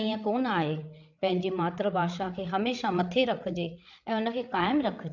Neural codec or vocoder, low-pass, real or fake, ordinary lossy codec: codec, 16 kHz, 8 kbps, FreqCodec, larger model; 7.2 kHz; fake; none